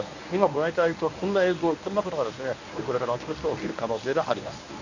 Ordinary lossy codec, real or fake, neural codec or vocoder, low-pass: none; fake; codec, 24 kHz, 0.9 kbps, WavTokenizer, medium speech release version 1; 7.2 kHz